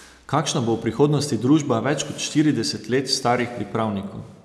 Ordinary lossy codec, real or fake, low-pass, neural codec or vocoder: none; real; none; none